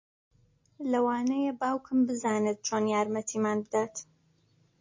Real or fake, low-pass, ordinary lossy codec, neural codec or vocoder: real; 7.2 kHz; MP3, 32 kbps; none